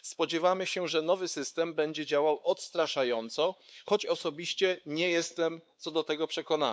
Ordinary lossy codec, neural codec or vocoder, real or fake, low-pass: none; codec, 16 kHz, 4 kbps, X-Codec, WavLM features, trained on Multilingual LibriSpeech; fake; none